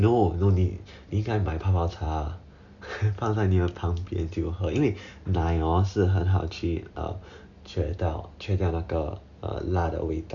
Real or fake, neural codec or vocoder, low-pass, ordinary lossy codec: real; none; 7.2 kHz; none